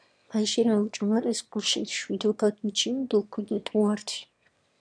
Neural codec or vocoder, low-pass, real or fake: autoencoder, 22.05 kHz, a latent of 192 numbers a frame, VITS, trained on one speaker; 9.9 kHz; fake